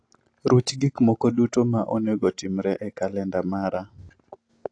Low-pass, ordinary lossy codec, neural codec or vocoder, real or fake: 9.9 kHz; AAC, 48 kbps; none; real